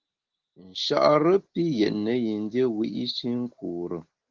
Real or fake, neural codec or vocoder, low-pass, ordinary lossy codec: real; none; 7.2 kHz; Opus, 16 kbps